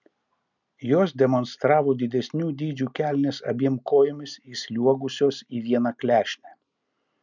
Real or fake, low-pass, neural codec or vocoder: real; 7.2 kHz; none